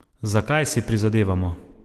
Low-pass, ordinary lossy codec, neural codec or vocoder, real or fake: 14.4 kHz; Opus, 16 kbps; autoencoder, 48 kHz, 128 numbers a frame, DAC-VAE, trained on Japanese speech; fake